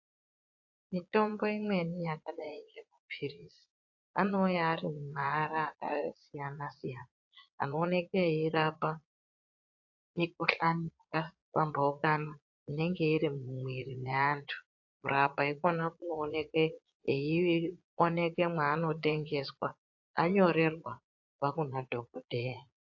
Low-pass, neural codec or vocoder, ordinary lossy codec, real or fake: 7.2 kHz; vocoder, 22.05 kHz, 80 mel bands, Vocos; AAC, 48 kbps; fake